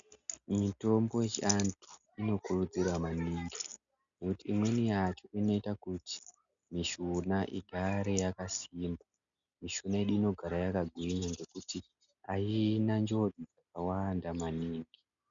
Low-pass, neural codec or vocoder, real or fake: 7.2 kHz; none; real